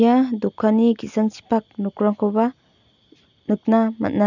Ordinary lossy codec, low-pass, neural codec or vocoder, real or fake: none; 7.2 kHz; none; real